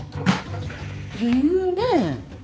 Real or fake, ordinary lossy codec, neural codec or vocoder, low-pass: fake; none; codec, 16 kHz, 4 kbps, X-Codec, HuBERT features, trained on balanced general audio; none